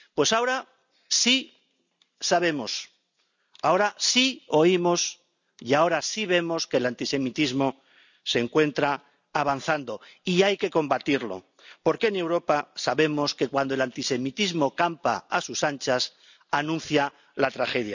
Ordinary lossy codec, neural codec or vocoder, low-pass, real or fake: none; none; 7.2 kHz; real